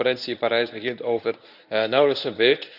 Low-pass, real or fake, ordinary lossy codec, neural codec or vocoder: 5.4 kHz; fake; none; codec, 24 kHz, 0.9 kbps, WavTokenizer, medium speech release version 1